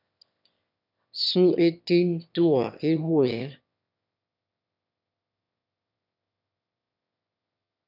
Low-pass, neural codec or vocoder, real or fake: 5.4 kHz; autoencoder, 22.05 kHz, a latent of 192 numbers a frame, VITS, trained on one speaker; fake